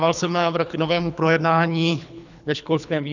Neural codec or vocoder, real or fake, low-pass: codec, 24 kHz, 3 kbps, HILCodec; fake; 7.2 kHz